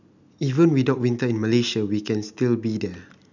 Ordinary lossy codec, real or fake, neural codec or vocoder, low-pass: none; real; none; 7.2 kHz